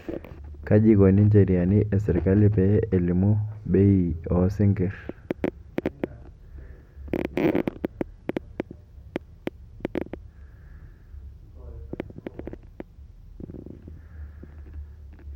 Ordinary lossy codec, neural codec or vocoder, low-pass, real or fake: MP3, 64 kbps; none; 19.8 kHz; real